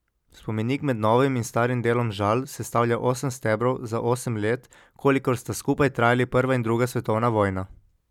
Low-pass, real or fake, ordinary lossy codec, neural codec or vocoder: 19.8 kHz; real; none; none